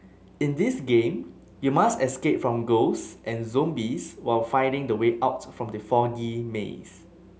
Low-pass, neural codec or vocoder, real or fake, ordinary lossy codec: none; none; real; none